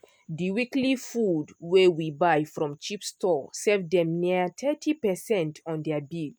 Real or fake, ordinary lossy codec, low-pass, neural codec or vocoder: real; none; none; none